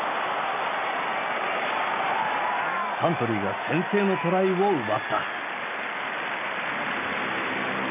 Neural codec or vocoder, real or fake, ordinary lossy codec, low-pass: none; real; none; 3.6 kHz